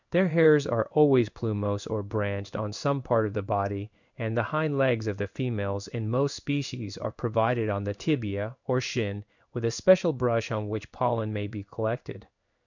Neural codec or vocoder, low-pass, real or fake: codec, 16 kHz in and 24 kHz out, 1 kbps, XY-Tokenizer; 7.2 kHz; fake